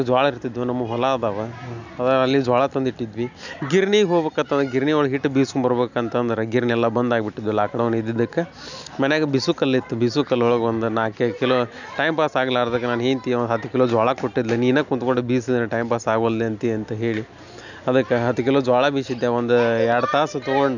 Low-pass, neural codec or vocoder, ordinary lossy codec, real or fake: 7.2 kHz; none; none; real